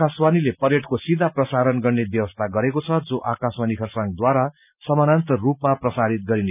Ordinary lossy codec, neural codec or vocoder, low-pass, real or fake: none; none; 3.6 kHz; real